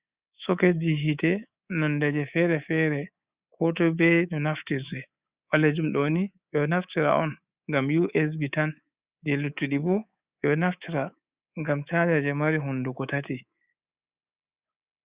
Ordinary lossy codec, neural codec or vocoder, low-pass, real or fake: Opus, 64 kbps; codec, 24 kHz, 3.1 kbps, DualCodec; 3.6 kHz; fake